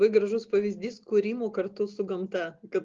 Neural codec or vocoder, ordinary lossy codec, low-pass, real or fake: none; Opus, 32 kbps; 7.2 kHz; real